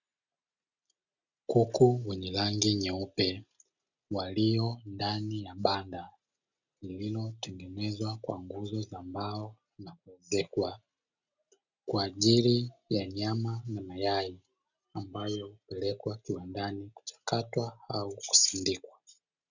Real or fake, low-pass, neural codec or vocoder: real; 7.2 kHz; none